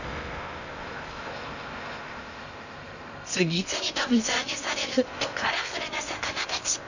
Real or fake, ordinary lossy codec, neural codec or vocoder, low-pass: fake; none; codec, 16 kHz in and 24 kHz out, 0.6 kbps, FocalCodec, streaming, 2048 codes; 7.2 kHz